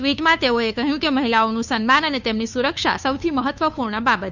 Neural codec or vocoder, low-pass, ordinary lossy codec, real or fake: codec, 16 kHz, 2 kbps, FunCodec, trained on Chinese and English, 25 frames a second; 7.2 kHz; none; fake